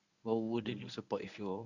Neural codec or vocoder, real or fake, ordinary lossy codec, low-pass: codec, 24 kHz, 0.9 kbps, WavTokenizer, medium speech release version 1; fake; none; 7.2 kHz